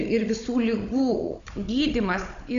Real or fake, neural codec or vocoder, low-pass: fake; codec, 16 kHz, 16 kbps, FunCodec, trained on Chinese and English, 50 frames a second; 7.2 kHz